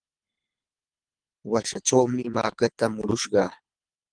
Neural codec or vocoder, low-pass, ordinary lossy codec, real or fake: codec, 24 kHz, 3 kbps, HILCodec; 9.9 kHz; Opus, 32 kbps; fake